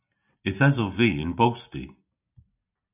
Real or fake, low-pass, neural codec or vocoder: real; 3.6 kHz; none